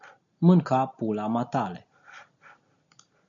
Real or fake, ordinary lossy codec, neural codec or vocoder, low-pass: real; MP3, 96 kbps; none; 7.2 kHz